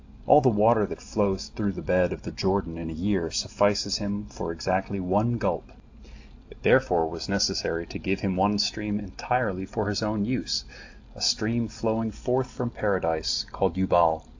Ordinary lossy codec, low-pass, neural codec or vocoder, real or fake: AAC, 48 kbps; 7.2 kHz; none; real